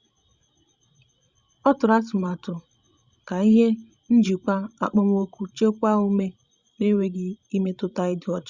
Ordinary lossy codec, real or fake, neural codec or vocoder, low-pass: Opus, 64 kbps; fake; codec, 16 kHz, 16 kbps, FreqCodec, larger model; 7.2 kHz